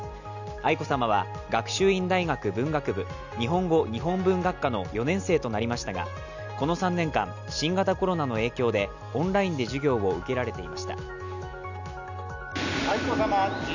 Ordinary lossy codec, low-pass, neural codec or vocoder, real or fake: none; 7.2 kHz; none; real